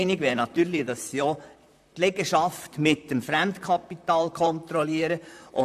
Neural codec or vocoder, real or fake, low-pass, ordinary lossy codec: vocoder, 44.1 kHz, 128 mel bands, Pupu-Vocoder; fake; 14.4 kHz; MP3, 96 kbps